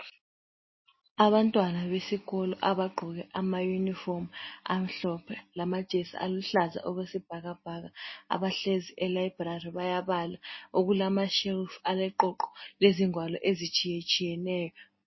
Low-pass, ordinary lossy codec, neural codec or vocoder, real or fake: 7.2 kHz; MP3, 24 kbps; none; real